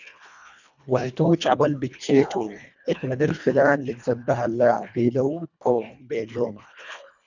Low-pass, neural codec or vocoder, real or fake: 7.2 kHz; codec, 24 kHz, 1.5 kbps, HILCodec; fake